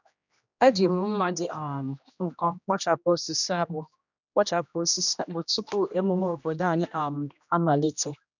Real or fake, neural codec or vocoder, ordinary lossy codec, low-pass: fake; codec, 16 kHz, 1 kbps, X-Codec, HuBERT features, trained on general audio; none; 7.2 kHz